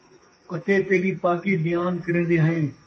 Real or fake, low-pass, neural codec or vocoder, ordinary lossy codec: fake; 10.8 kHz; codec, 32 kHz, 1.9 kbps, SNAC; MP3, 32 kbps